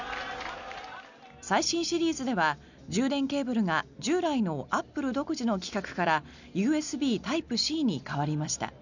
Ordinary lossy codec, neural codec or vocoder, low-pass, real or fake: none; none; 7.2 kHz; real